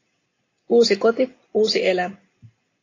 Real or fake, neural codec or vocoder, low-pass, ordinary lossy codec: real; none; 7.2 kHz; AAC, 32 kbps